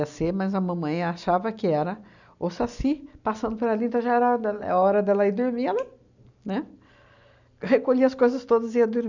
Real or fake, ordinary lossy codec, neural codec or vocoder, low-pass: real; none; none; 7.2 kHz